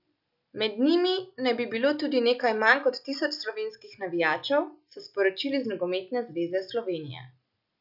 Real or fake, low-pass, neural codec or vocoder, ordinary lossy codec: real; 5.4 kHz; none; none